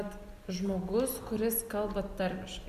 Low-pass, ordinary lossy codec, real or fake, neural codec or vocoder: 14.4 kHz; Opus, 64 kbps; real; none